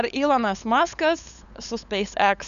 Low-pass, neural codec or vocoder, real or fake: 7.2 kHz; codec, 16 kHz, 8 kbps, FunCodec, trained on LibriTTS, 25 frames a second; fake